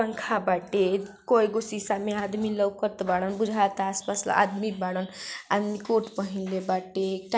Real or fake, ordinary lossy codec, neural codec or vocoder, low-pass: real; none; none; none